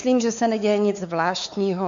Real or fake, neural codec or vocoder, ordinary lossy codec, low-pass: fake; codec, 16 kHz, 4 kbps, X-Codec, WavLM features, trained on Multilingual LibriSpeech; MP3, 64 kbps; 7.2 kHz